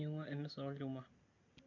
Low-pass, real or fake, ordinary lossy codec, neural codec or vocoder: 7.2 kHz; real; none; none